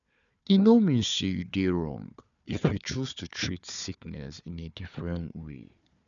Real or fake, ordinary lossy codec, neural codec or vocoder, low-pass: fake; MP3, 96 kbps; codec, 16 kHz, 4 kbps, FunCodec, trained on Chinese and English, 50 frames a second; 7.2 kHz